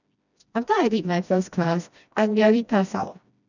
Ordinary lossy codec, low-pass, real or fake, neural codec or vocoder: none; 7.2 kHz; fake; codec, 16 kHz, 1 kbps, FreqCodec, smaller model